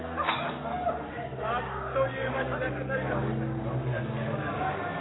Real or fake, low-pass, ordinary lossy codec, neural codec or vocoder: fake; 7.2 kHz; AAC, 16 kbps; codec, 44.1 kHz, 7.8 kbps, Pupu-Codec